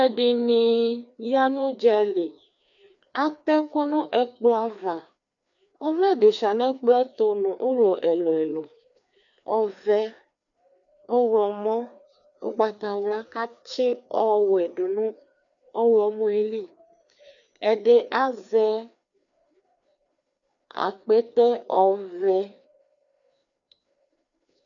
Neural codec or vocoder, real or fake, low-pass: codec, 16 kHz, 2 kbps, FreqCodec, larger model; fake; 7.2 kHz